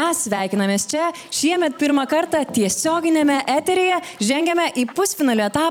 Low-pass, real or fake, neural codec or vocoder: 19.8 kHz; fake; vocoder, 44.1 kHz, 128 mel bands every 256 samples, BigVGAN v2